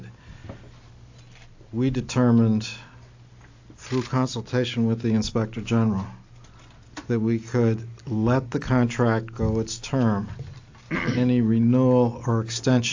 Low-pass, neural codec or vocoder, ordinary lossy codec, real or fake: 7.2 kHz; none; AAC, 48 kbps; real